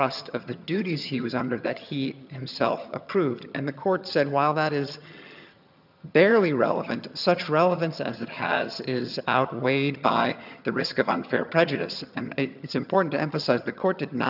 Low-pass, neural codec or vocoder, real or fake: 5.4 kHz; vocoder, 22.05 kHz, 80 mel bands, HiFi-GAN; fake